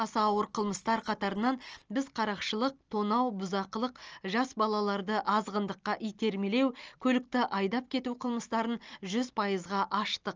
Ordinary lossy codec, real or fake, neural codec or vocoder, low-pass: Opus, 24 kbps; real; none; 7.2 kHz